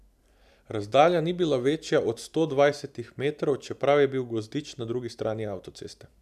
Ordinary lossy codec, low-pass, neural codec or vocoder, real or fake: none; 14.4 kHz; none; real